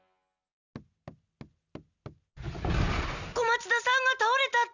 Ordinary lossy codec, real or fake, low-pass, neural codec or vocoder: none; real; 7.2 kHz; none